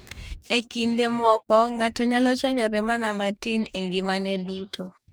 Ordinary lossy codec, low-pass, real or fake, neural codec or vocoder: none; none; fake; codec, 44.1 kHz, 2.6 kbps, DAC